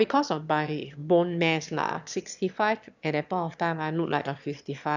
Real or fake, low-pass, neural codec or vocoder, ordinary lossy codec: fake; 7.2 kHz; autoencoder, 22.05 kHz, a latent of 192 numbers a frame, VITS, trained on one speaker; none